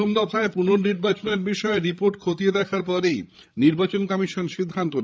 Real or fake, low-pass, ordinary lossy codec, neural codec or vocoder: fake; none; none; codec, 16 kHz, 8 kbps, FreqCodec, larger model